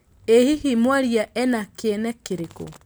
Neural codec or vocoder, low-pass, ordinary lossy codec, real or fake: none; none; none; real